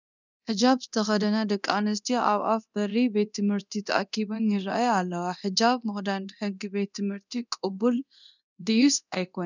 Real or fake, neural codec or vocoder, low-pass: fake; codec, 24 kHz, 0.9 kbps, DualCodec; 7.2 kHz